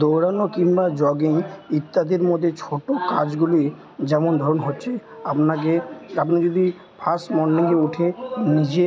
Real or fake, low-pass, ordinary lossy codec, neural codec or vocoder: real; none; none; none